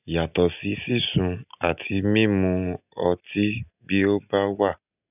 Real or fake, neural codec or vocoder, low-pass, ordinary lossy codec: real; none; 3.6 kHz; none